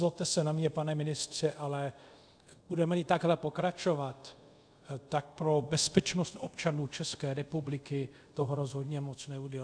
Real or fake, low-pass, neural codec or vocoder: fake; 9.9 kHz; codec, 24 kHz, 0.5 kbps, DualCodec